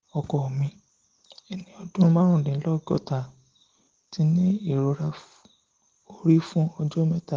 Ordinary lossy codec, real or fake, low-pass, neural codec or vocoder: Opus, 16 kbps; real; 7.2 kHz; none